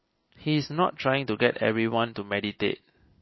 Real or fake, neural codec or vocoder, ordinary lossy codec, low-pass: real; none; MP3, 24 kbps; 7.2 kHz